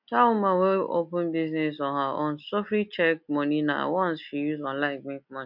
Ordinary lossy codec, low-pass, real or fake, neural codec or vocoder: MP3, 48 kbps; 5.4 kHz; real; none